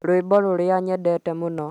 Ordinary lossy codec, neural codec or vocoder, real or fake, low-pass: none; none; real; 19.8 kHz